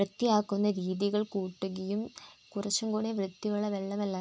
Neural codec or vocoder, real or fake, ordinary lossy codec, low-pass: none; real; none; none